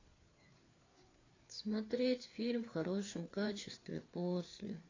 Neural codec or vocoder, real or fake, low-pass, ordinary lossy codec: codec, 16 kHz, 4 kbps, FreqCodec, larger model; fake; 7.2 kHz; AAC, 32 kbps